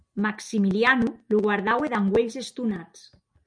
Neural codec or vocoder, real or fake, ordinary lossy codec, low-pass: none; real; MP3, 96 kbps; 9.9 kHz